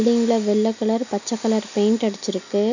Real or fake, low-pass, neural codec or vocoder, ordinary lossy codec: real; 7.2 kHz; none; none